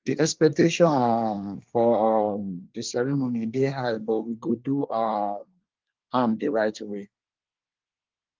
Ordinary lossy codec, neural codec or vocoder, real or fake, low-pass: Opus, 32 kbps; codec, 24 kHz, 1 kbps, SNAC; fake; 7.2 kHz